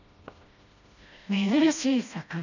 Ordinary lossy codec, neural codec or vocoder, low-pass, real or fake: none; codec, 16 kHz, 1 kbps, FreqCodec, smaller model; 7.2 kHz; fake